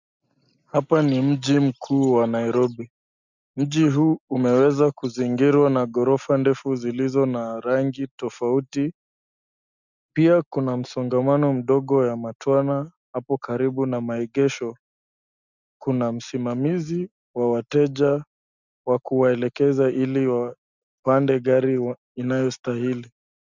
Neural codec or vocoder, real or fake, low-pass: none; real; 7.2 kHz